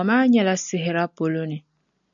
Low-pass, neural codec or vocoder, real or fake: 7.2 kHz; none; real